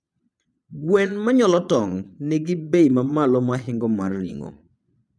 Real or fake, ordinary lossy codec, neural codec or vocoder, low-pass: fake; none; vocoder, 22.05 kHz, 80 mel bands, Vocos; none